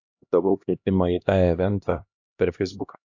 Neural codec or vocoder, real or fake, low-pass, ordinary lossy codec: codec, 16 kHz, 1 kbps, X-Codec, HuBERT features, trained on LibriSpeech; fake; 7.2 kHz; AAC, 48 kbps